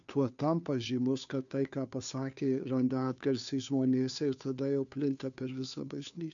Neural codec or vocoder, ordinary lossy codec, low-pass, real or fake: codec, 16 kHz, 2 kbps, FunCodec, trained on Chinese and English, 25 frames a second; MP3, 96 kbps; 7.2 kHz; fake